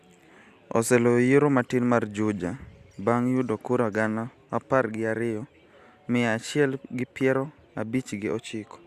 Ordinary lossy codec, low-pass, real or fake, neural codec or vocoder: Opus, 64 kbps; 14.4 kHz; real; none